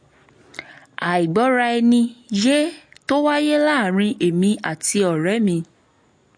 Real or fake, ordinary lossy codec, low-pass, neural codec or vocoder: real; MP3, 48 kbps; 9.9 kHz; none